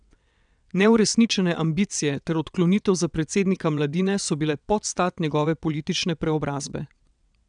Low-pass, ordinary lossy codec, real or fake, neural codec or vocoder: 9.9 kHz; none; fake; vocoder, 22.05 kHz, 80 mel bands, WaveNeXt